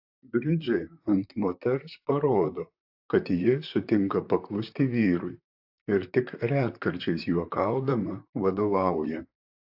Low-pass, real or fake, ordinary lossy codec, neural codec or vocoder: 5.4 kHz; fake; AAC, 48 kbps; vocoder, 44.1 kHz, 128 mel bands, Pupu-Vocoder